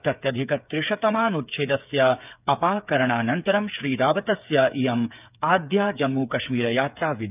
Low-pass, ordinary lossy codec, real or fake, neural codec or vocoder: 3.6 kHz; none; fake; codec, 16 kHz, 8 kbps, FreqCodec, smaller model